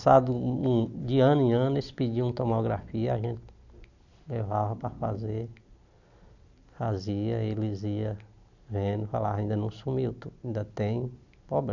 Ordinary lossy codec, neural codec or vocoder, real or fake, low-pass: none; none; real; 7.2 kHz